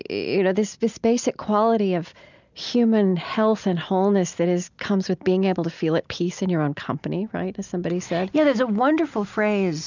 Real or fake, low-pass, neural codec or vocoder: real; 7.2 kHz; none